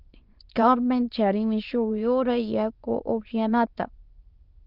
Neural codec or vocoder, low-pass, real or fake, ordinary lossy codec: autoencoder, 22.05 kHz, a latent of 192 numbers a frame, VITS, trained on many speakers; 5.4 kHz; fake; Opus, 32 kbps